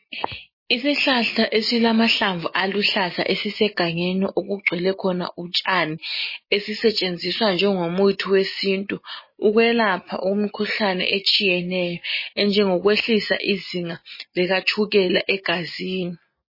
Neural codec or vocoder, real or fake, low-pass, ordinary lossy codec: none; real; 5.4 kHz; MP3, 24 kbps